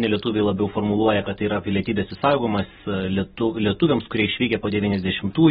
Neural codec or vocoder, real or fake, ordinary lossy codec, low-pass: none; real; AAC, 16 kbps; 19.8 kHz